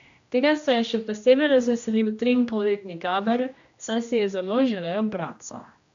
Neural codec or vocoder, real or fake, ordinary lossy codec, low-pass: codec, 16 kHz, 1 kbps, X-Codec, HuBERT features, trained on general audio; fake; AAC, 64 kbps; 7.2 kHz